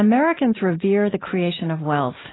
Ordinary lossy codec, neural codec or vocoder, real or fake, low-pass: AAC, 16 kbps; none; real; 7.2 kHz